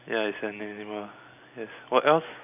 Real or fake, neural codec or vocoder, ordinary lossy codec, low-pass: real; none; none; 3.6 kHz